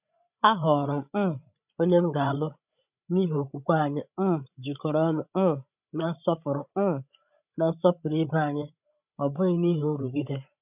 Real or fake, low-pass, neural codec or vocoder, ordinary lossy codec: fake; 3.6 kHz; codec, 16 kHz, 8 kbps, FreqCodec, larger model; none